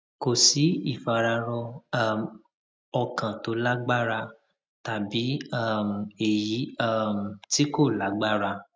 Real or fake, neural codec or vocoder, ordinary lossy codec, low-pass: real; none; none; none